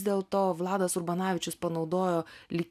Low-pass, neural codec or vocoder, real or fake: 14.4 kHz; none; real